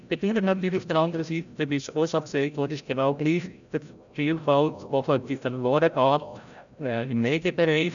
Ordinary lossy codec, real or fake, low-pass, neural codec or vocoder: none; fake; 7.2 kHz; codec, 16 kHz, 0.5 kbps, FreqCodec, larger model